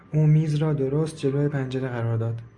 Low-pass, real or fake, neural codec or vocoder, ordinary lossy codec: 10.8 kHz; real; none; Opus, 64 kbps